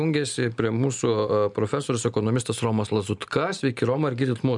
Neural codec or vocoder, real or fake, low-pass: none; real; 10.8 kHz